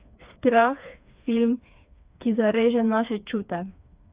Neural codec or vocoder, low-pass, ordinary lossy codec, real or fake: codec, 16 kHz, 4 kbps, FreqCodec, smaller model; 3.6 kHz; Opus, 64 kbps; fake